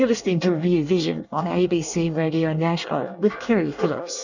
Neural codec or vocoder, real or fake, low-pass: codec, 24 kHz, 1 kbps, SNAC; fake; 7.2 kHz